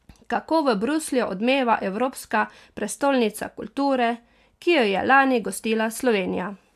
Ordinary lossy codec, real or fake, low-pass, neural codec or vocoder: AAC, 96 kbps; real; 14.4 kHz; none